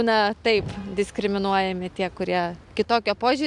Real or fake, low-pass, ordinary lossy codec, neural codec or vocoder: real; 10.8 kHz; MP3, 96 kbps; none